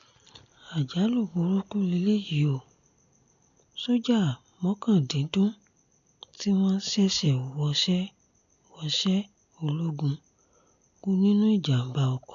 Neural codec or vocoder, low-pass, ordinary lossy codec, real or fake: none; 7.2 kHz; none; real